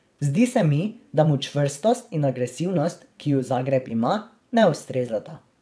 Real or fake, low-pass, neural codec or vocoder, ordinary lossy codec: fake; none; vocoder, 22.05 kHz, 80 mel bands, WaveNeXt; none